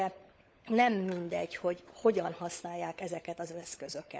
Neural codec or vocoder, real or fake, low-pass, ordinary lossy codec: codec, 16 kHz, 16 kbps, FunCodec, trained on LibriTTS, 50 frames a second; fake; none; none